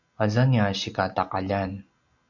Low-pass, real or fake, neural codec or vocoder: 7.2 kHz; real; none